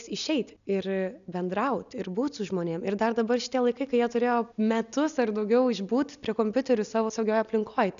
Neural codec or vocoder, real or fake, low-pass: none; real; 7.2 kHz